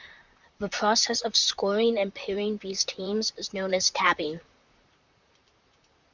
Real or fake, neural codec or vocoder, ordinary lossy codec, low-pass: fake; vocoder, 44.1 kHz, 128 mel bands, Pupu-Vocoder; Opus, 64 kbps; 7.2 kHz